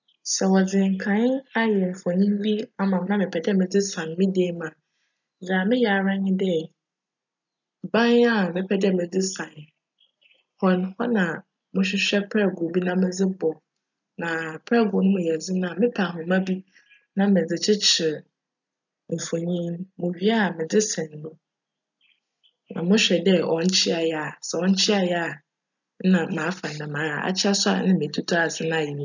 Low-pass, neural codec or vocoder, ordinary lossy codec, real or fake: 7.2 kHz; none; none; real